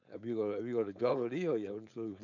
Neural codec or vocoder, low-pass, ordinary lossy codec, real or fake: codec, 16 kHz, 4.8 kbps, FACodec; 7.2 kHz; none; fake